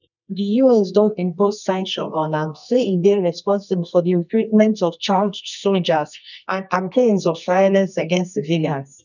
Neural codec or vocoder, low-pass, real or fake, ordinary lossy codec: codec, 24 kHz, 0.9 kbps, WavTokenizer, medium music audio release; 7.2 kHz; fake; none